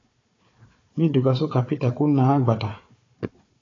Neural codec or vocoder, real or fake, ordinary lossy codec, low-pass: codec, 16 kHz, 4 kbps, FunCodec, trained on Chinese and English, 50 frames a second; fake; AAC, 32 kbps; 7.2 kHz